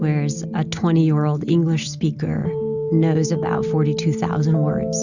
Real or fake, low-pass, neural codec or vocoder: real; 7.2 kHz; none